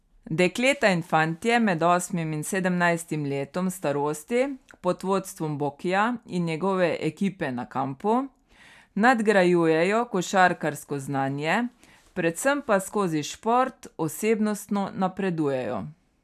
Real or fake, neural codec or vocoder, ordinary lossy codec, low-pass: real; none; none; 14.4 kHz